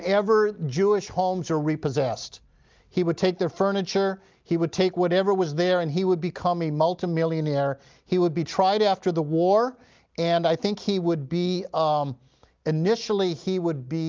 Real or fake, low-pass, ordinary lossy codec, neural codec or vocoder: real; 7.2 kHz; Opus, 24 kbps; none